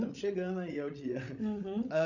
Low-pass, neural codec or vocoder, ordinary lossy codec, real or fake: 7.2 kHz; codec, 16 kHz, 16 kbps, FreqCodec, larger model; none; fake